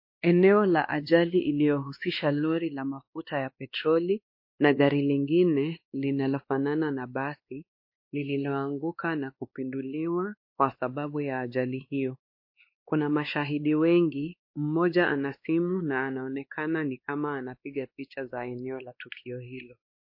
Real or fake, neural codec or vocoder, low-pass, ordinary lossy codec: fake; codec, 16 kHz, 2 kbps, X-Codec, WavLM features, trained on Multilingual LibriSpeech; 5.4 kHz; MP3, 32 kbps